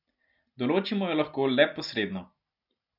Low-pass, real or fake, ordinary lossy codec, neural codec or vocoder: 5.4 kHz; real; none; none